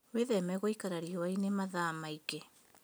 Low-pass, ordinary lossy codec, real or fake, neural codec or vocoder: none; none; real; none